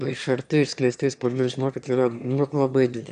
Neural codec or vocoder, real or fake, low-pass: autoencoder, 22.05 kHz, a latent of 192 numbers a frame, VITS, trained on one speaker; fake; 9.9 kHz